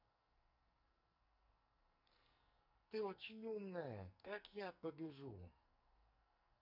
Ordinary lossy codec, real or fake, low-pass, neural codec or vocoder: none; fake; 5.4 kHz; codec, 44.1 kHz, 2.6 kbps, SNAC